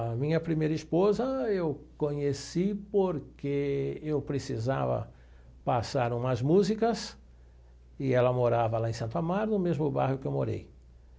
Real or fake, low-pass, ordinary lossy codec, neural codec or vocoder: real; none; none; none